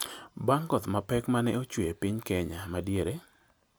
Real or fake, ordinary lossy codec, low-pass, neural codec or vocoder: real; none; none; none